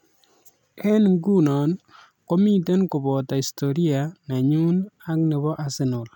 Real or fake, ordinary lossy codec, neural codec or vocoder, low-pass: real; none; none; 19.8 kHz